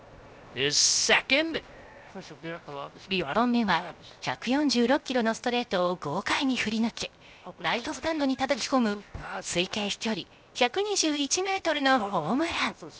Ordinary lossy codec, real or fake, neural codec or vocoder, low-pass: none; fake; codec, 16 kHz, 0.7 kbps, FocalCodec; none